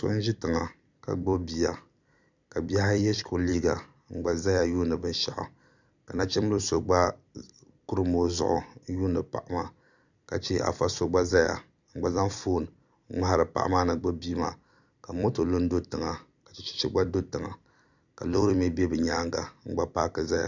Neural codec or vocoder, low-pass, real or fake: vocoder, 44.1 kHz, 128 mel bands every 256 samples, BigVGAN v2; 7.2 kHz; fake